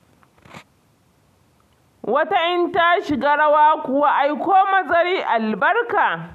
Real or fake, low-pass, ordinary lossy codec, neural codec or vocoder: real; 14.4 kHz; none; none